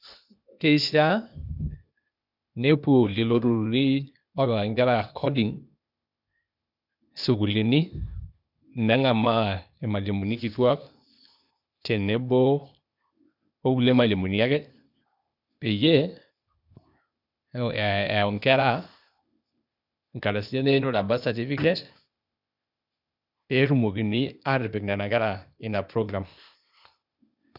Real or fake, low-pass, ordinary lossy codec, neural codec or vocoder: fake; 5.4 kHz; none; codec, 16 kHz, 0.8 kbps, ZipCodec